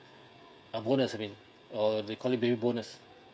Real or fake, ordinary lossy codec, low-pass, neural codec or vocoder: fake; none; none; codec, 16 kHz, 16 kbps, FreqCodec, smaller model